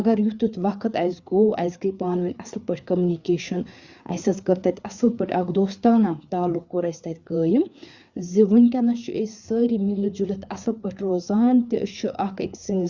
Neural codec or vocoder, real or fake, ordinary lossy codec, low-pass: codec, 16 kHz, 4 kbps, FreqCodec, larger model; fake; Opus, 64 kbps; 7.2 kHz